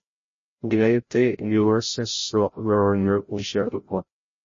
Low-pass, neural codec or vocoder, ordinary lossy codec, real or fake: 7.2 kHz; codec, 16 kHz, 0.5 kbps, FreqCodec, larger model; MP3, 32 kbps; fake